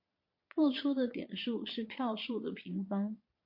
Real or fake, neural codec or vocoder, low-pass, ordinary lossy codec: fake; vocoder, 22.05 kHz, 80 mel bands, Vocos; 5.4 kHz; MP3, 32 kbps